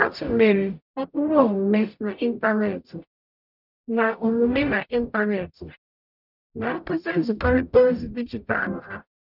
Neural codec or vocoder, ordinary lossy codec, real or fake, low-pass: codec, 44.1 kHz, 0.9 kbps, DAC; none; fake; 5.4 kHz